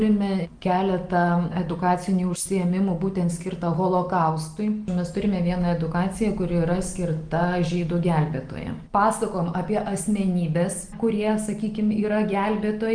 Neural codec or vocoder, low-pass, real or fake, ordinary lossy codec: none; 9.9 kHz; real; Opus, 24 kbps